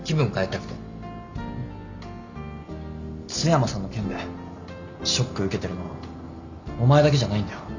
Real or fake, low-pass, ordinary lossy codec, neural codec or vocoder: real; 7.2 kHz; Opus, 64 kbps; none